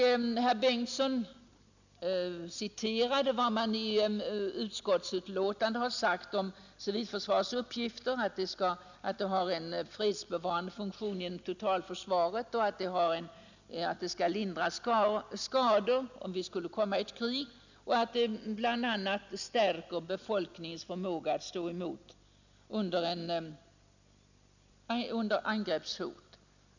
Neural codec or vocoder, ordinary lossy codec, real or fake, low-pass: none; none; real; 7.2 kHz